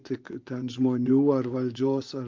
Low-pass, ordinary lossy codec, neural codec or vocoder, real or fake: 7.2 kHz; Opus, 24 kbps; vocoder, 24 kHz, 100 mel bands, Vocos; fake